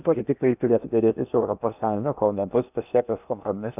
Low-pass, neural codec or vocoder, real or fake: 3.6 kHz; codec, 16 kHz in and 24 kHz out, 0.8 kbps, FocalCodec, streaming, 65536 codes; fake